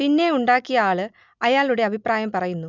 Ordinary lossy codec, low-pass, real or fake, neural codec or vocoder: none; 7.2 kHz; real; none